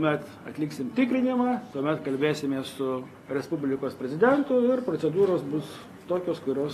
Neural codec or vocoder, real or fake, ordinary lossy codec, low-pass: none; real; AAC, 48 kbps; 14.4 kHz